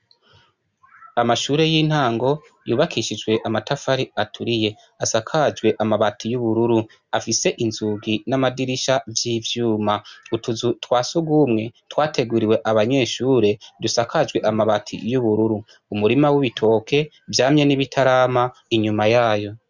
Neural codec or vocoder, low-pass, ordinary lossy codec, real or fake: none; 7.2 kHz; Opus, 64 kbps; real